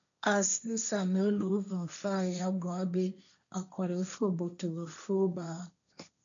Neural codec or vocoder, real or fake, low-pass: codec, 16 kHz, 1.1 kbps, Voila-Tokenizer; fake; 7.2 kHz